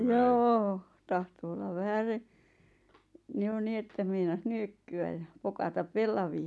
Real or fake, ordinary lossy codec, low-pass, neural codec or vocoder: real; none; none; none